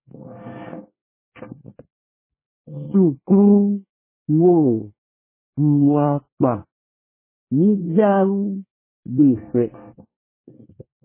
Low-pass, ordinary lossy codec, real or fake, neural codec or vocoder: 3.6 kHz; MP3, 16 kbps; fake; codec, 24 kHz, 1 kbps, SNAC